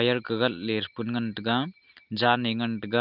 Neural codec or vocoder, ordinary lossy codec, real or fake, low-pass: none; Opus, 32 kbps; real; 5.4 kHz